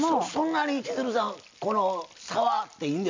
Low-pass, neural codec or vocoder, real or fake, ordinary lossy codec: 7.2 kHz; none; real; MP3, 64 kbps